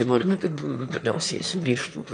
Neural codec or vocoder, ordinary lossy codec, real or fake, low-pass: autoencoder, 22.05 kHz, a latent of 192 numbers a frame, VITS, trained on one speaker; MP3, 64 kbps; fake; 9.9 kHz